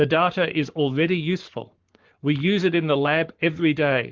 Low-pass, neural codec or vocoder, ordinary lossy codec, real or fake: 7.2 kHz; codec, 44.1 kHz, 7.8 kbps, Pupu-Codec; Opus, 32 kbps; fake